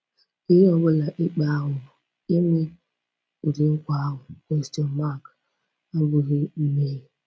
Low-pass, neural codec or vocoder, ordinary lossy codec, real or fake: none; none; none; real